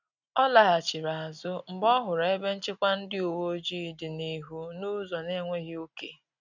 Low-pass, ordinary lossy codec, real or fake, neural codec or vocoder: 7.2 kHz; none; real; none